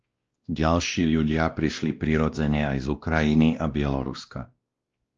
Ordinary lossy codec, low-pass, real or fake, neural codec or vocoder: Opus, 24 kbps; 7.2 kHz; fake; codec, 16 kHz, 1 kbps, X-Codec, WavLM features, trained on Multilingual LibriSpeech